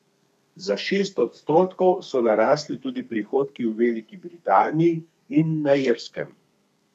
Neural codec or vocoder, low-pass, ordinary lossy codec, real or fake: codec, 32 kHz, 1.9 kbps, SNAC; 14.4 kHz; none; fake